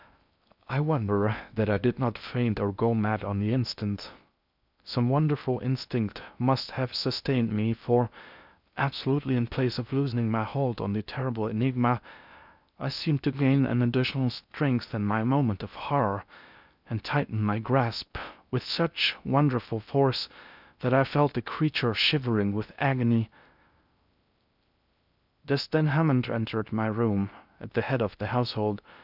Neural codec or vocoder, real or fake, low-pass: codec, 16 kHz in and 24 kHz out, 0.6 kbps, FocalCodec, streaming, 2048 codes; fake; 5.4 kHz